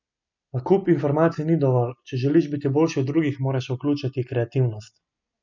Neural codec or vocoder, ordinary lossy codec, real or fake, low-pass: none; none; real; 7.2 kHz